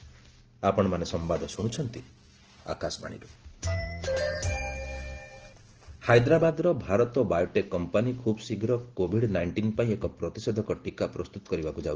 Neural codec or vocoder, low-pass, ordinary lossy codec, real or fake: none; 7.2 kHz; Opus, 16 kbps; real